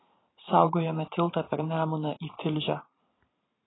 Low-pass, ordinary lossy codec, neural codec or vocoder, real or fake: 7.2 kHz; AAC, 16 kbps; vocoder, 44.1 kHz, 128 mel bands every 512 samples, BigVGAN v2; fake